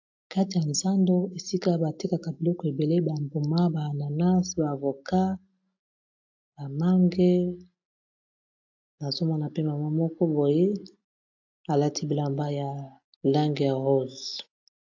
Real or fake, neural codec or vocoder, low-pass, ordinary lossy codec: real; none; 7.2 kHz; AAC, 48 kbps